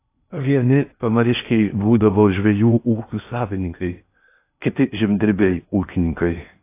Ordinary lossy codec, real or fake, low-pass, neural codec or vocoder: AAC, 24 kbps; fake; 3.6 kHz; codec, 16 kHz in and 24 kHz out, 0.8 kbps, FocalCodec, streaming, 65536 codes